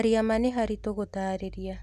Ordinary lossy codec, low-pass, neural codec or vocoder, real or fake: none; 14.4 kHz; none; real